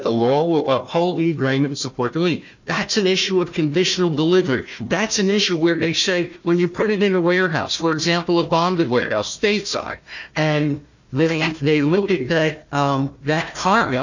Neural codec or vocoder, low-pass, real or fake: codec, 16 kHz, 1 kbps, FunCodec, trained on Chinese and English, 50 frames a second; 7.2 kHz; fake